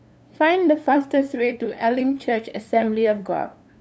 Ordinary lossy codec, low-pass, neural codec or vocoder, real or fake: none; none; codec, 16 kHz, 2 kbps, FunCodec, trained on LibriTTS, 25 frames a second; fake